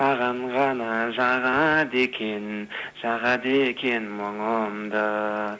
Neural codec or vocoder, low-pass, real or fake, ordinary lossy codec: none; none; real; none